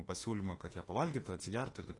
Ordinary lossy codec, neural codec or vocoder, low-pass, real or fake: AAC, 32 kbps; autoencoder, 48 kHz, 32 numbers a frame, DAC-VAE, trained on Japanese speech; 10.8 kHz; fake